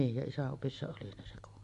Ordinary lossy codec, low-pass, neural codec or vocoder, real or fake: AAC, 64 kbps; 10.8 kHz; none; real